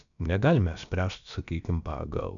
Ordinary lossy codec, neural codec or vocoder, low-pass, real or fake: MP3, 96 kbps; codec, 16 kHz, about 1 kbps, DyCAST, with the encoder's durations; 7.2 kHz; fake